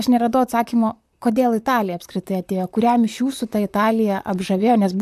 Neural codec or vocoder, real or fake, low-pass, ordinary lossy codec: vocoder, 44.1 kHz, 128 mel bands, Pupu-Vocoder; fake; 14.4 kHz; AAC, 96 kbps